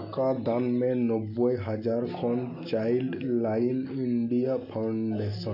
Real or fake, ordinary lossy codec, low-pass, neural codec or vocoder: real; none; 5.4 kHz; none